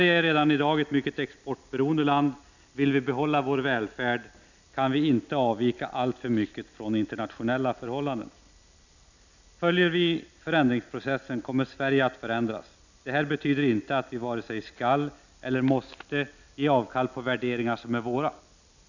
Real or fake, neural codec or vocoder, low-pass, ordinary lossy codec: real; none; 7.2 kHz; none